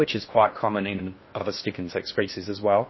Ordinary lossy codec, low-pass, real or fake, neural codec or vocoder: MP3, 24 kbps; 7.2 kHz; fake; codec, 16 kHz in and 24 kHz out, 0.6 kbps, FocalCodec, streaming, 4096 codes